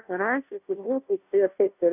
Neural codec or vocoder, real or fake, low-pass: codec, 16 kHz, 0.5 kbps, FunCodec, trained on Chinese and English, 25 frames a second; fake; 3.6 kHz